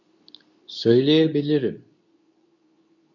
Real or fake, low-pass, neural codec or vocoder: fake; 7.2 kHz; codec, 24 kHz, 0.9 kbps, WavTokenizer, medium speech release version 2